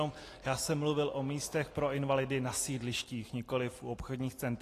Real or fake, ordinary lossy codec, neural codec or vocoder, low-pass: real; AAC, 48 kbps; none; 14.4 kHz